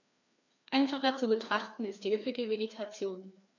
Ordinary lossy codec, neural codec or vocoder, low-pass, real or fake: none; codec, 16 kHz, 2 kbps, FreqCodec, larger model; 7.2 kHz; fake